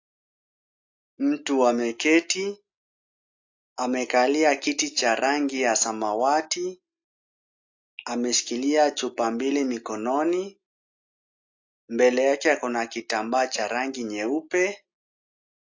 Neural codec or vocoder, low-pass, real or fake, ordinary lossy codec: none; 7.2 kHz; real; AAC, 48 kbps